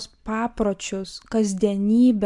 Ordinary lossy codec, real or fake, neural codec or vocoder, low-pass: AAC, 64 kbps; real; none; 10.8 kHz